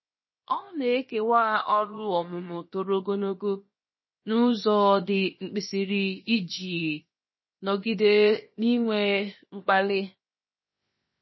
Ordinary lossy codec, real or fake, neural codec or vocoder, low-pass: MP3, 24 kbps; fake; codec, 16 kHz, 0.7 kbps, FocalCodec; 7.2 kHz